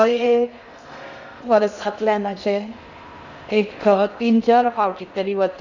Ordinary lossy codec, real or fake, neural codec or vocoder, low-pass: none; fake; codec, 16 kHz in and 24 kHz out, 0.6 kbps, FocalCodec, streaming, 2048 codes; 7.2 kHz